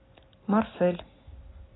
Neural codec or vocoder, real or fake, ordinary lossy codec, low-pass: none; real; AAC, 16 kbps; 7.2 kHz